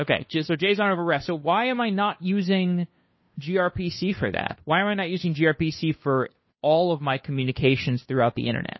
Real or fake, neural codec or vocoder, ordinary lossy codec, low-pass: fake; codec, 16 kHz, 2 kbps, FunCodec, trained on Chinese and English, 25 frames a second; MP3, 24 kbps; 7.2 kHz